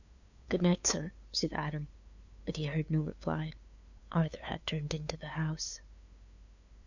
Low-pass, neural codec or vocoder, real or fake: 7.2 kHz; codec, 16 kHz, 2 kbps, FunCodec, trained on LibriTTS, 25 frames a second; fake